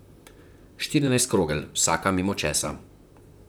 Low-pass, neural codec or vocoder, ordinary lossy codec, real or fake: none; vocoder, 44.1 kHz, 128 mel bands, Pupu-Vocoder; none; fake